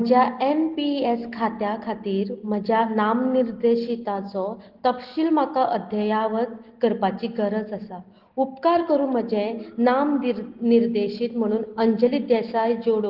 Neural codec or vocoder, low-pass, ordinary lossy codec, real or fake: none; 5.4 kHz; Opus, 16 kbps; real